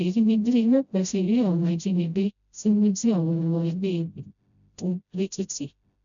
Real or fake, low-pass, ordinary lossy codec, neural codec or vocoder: fake; 7.2 kHz; none; codec, 16 kHz, 0.5 kbps, FreqCodec, smaller model